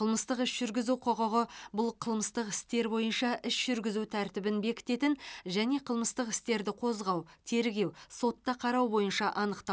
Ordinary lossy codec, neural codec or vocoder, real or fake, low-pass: none; none; real; none